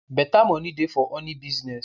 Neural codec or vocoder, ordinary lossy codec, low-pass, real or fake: none; none; 7.2 kHz; real